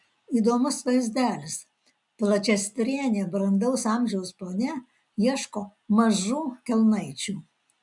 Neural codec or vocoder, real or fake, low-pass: none; real; 10.8 kHz